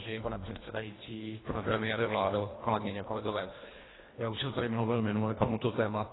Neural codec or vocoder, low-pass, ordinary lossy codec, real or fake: codec, 24 kHz, 1.5 kbps, HILCodec; 7.2 kHz; AAC, 16 kbps; fake